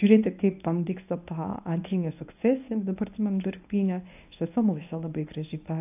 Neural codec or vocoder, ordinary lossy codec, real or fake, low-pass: codec, 24 kHz, 0.9 kbps, WavTokenizer, medium speech release version 1; AAC, 32 kbps; fake; 3.6 kHz